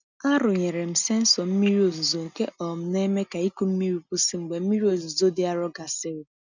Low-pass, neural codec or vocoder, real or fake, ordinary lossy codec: 7.2 kHz; none; real; none